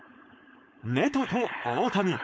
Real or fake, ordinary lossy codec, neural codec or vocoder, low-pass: fake; none; codec, 16 kHz, 4.8 kbps, FACodec; none